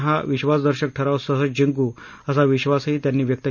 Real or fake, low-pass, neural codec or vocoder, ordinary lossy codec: real; 7.2 kHz; none; none